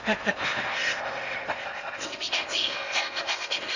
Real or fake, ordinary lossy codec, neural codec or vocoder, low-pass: fake; none; codec, 16 kHz in and 24 kHz out, 0.8 kbps, FocalCodec, streaming, 65536 codes; 7.2 kHz